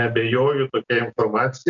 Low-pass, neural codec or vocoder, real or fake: 7.2 kHz; none; real